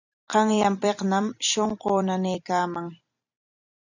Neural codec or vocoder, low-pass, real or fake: vocoder, 44.1 kHz, 128 mel bands every 256 samples, BigVGAN v2; 7.2 kHz; fake